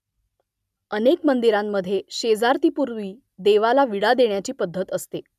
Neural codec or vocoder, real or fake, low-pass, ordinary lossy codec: none; real; 14.4 kHz; none